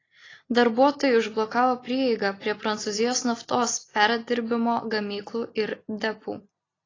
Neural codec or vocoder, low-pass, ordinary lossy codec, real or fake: none; 7.2 kHz; AAC, 32 kbps; real